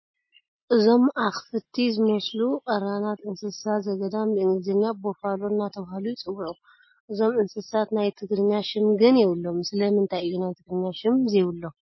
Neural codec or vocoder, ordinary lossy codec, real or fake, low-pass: none; MP3, 24 kbps; real; 7.2 kHz